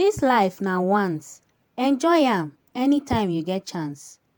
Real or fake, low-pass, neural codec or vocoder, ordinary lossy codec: fake; 19.8 kHz; vocoder, 48 kHz, 128 mel bands, Vocos; MP3, 96 kbps